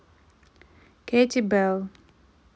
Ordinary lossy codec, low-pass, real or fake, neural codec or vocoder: none; none; real; none